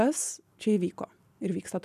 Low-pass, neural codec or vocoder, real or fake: 14.4 kHz; none; real